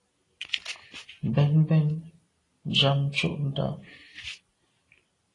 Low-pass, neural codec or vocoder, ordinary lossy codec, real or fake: 10.8 kHz; none; AAC, 32 kbps; real